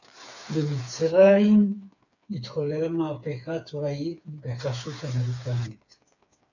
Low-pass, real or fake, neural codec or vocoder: 7.2 kHz; fake; codec, 16 kHz, 4 kbps, FreqCodec, smaller model